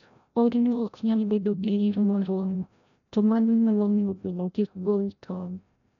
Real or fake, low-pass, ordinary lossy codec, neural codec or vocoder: fake; 7.2 kHz; none; codec, 16 kHz, 0.5 kbps, FreqCodec, larger model